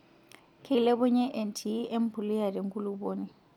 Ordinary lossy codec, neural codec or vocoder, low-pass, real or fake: none; none; 19.8 kHz; real